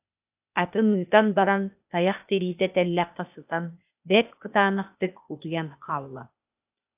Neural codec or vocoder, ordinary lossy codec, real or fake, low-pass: codec, 16 kHz, 0.8 kbps, ZipCodec; AAC, 32 kbps; fake; 3.6 kHz